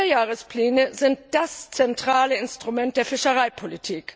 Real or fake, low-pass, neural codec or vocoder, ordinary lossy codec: real; none; none; none